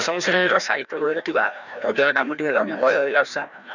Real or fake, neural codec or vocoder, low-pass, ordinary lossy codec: fake; codec, 16 kHz, 1 kbps, FreqCodec, larger model; 7.2 kHz; none